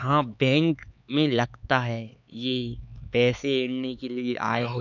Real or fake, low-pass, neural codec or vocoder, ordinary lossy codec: fake; 7.2 kHz; codec, 16 kHz, 4 kbps, X-Codec, HuBERT features, trained on LibriSpeech; none